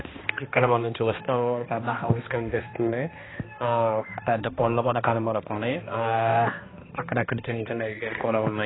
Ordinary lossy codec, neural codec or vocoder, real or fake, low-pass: AAC, 16 kbps; codec, 16 kHz, 1 kbps, X-Codec, HuBERT features, trained on balanced general audio; fake; 7.2 kHz